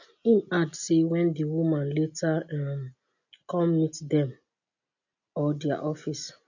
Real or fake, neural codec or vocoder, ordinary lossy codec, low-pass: real; none; none; 7.2 kHz